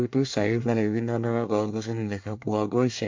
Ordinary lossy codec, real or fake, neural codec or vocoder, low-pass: MP3, 48 kbps; fake; codec, 24 kHz, 1 kbps, SNAC; 7.2 kHz